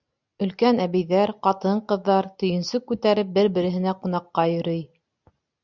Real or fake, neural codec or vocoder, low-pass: real; none; 7.2 kHz